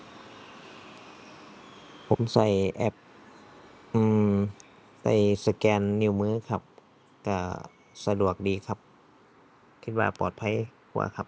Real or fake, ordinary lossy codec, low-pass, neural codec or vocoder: real; none; none; none